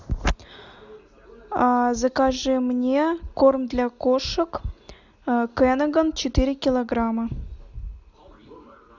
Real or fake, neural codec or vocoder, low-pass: real; none; 7.2 kHz